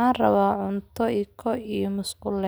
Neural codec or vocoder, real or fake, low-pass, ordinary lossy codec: none; real; none; none